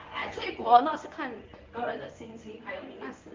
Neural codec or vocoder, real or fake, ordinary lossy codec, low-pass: codec, 24 kHz, 0.9 kbps, WavTokenizer, medium speech release version 1; fake; Opus, 24 kbps; 7.2 kHz